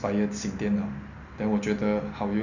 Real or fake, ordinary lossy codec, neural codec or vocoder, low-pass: real; none; none; 7.2 kHz